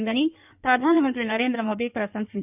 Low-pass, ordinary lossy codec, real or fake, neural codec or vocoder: 3.6 kHz; none; fake; codec, 16 kHz in and 24 kHz out, 1.1 kbps, FireRedTTS-2 codec